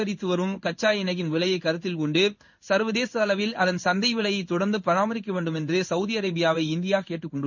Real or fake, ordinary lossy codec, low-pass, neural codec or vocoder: fake; none; 7.2 kHz; codec, 16 kHz in and 24 kHz out, 1 kbps, XY-Tokenizer